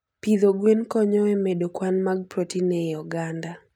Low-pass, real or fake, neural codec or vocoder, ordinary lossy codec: 19.8 kHz; real; none; none